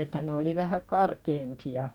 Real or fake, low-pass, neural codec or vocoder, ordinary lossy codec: fake; 19.8 kHz; codec, 44.1 kHz, 2.6 kbps, DAC; none